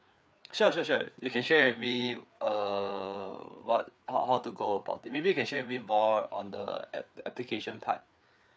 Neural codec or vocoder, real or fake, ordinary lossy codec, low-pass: codec, 16 kHz, 4 kbps, FreqCodec, larger model; fake; none; none